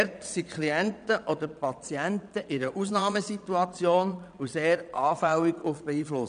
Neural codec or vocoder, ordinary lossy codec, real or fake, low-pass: vocoder, 22.05 kHz, 80 mel bands, Vocos; none; fake; 9.9 kHz